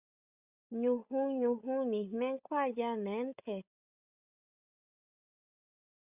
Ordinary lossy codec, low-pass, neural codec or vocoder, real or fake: Opus, 64 kbps; 3.6 kHz; codec, 16 kHz, 16 kbps, FreqCodec, larger model; fake